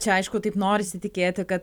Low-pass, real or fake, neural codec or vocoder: 19.8 kHz; real; none